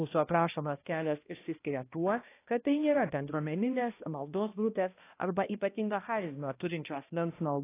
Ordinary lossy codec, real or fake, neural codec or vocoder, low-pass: AAC, 24 kbps; fake; codec, 16 kHz, 1 kbps, X-Codec, HuBERT features, trained on balanced general audio; 3.6 kHz